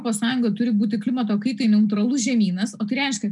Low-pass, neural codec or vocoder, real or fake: 10.8 kHz; none; real